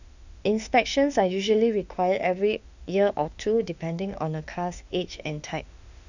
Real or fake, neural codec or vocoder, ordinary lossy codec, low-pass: fake; autoencoder, 48 kHz, 32 numbers a frame, DAC-VAE, trained on Japanese speech; none; 7.2 kHz